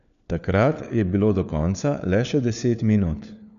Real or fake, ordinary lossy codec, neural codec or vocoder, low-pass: fake; none; codec, 16 kHz, 4 kbps, FunCodec, trained on LibriTTS, 50 frames a second; 7.2 kHz